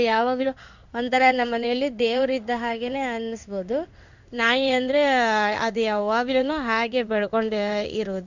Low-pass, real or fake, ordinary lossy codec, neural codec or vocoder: 7.2 kHz; fake; none; codec, 16 kHz in and 24 kHz out, 1 kbps, XY-Tokenizer